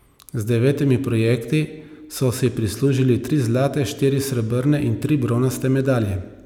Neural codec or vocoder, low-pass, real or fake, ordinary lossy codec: none; 19.8 kHz; real; none